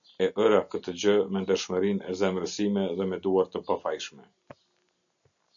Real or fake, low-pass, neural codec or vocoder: real; 7.2 kHz; none